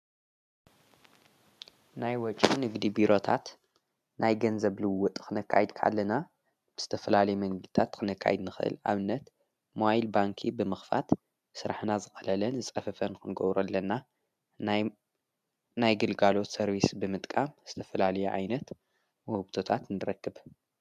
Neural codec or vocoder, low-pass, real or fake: none; 14.4 kHz; real